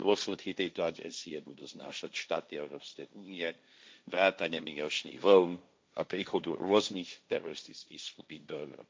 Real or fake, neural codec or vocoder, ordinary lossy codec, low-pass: fake; codec, 16 kHz, 1.1 kbps, Voila-Tokenizer; none; none